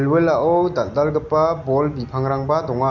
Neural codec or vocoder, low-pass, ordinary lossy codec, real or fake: none; 7.2 kHz; Opus, 64 kbps; real